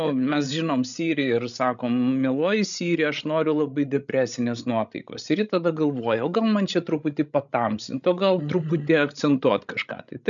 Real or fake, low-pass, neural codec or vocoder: fake; 7.2 kHz; codec, 16 kHz, 16 kbps, FreqCodec, larger model